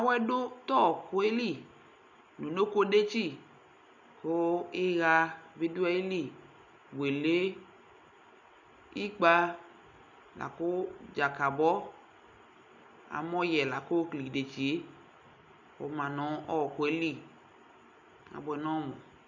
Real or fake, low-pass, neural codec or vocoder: real; 7.2 kHz; none